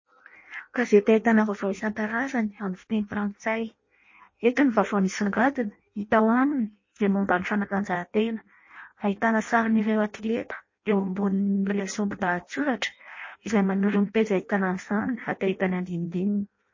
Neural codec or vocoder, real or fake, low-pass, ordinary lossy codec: codec, 16 kHz in and 24 kHz out, 0.6 kbps, FireRedTTS-2 codec; fake; 7.2 kHz; MP3, 32 kbps